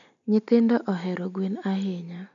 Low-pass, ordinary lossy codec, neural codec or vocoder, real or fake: 7.2 kHz; none; none; real